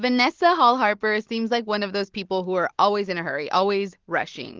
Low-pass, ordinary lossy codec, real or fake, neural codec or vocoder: 7.2 kHz; Opus, 16 kbps; real; none